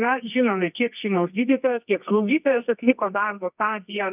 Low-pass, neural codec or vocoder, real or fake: 3.6 kHz; codec, 24 kHz, 0.9 kbps, WavTokenizer, medium music audio release; fake